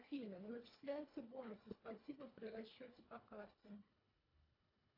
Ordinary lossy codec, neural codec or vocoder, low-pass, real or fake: AAC, 32 kbps; codec, 24 kHz, 1.5 kbps, HILCodec; 5.4 kHz; fake